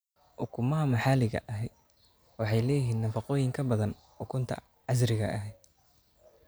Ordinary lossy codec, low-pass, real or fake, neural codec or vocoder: none; none; real; none